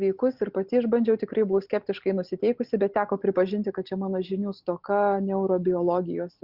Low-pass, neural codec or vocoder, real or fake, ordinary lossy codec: 5.4 kHz; none; real; Opus, 64 kbps